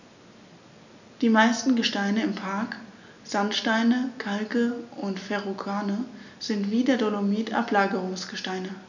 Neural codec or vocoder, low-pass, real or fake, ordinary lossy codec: none; 7.2 kHz; real; none